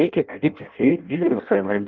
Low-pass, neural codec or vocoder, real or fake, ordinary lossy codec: 7.2 kHz; codec, 16 kHz in and 24 kHz out, 0.6 kbps, FireRedTTS-2 codec; fake; Opus, 24 kbps